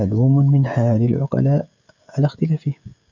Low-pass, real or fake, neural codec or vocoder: 7.2 kHz; fake; codec, 16 kHz, 16 kbps, FreqCodec, smaller model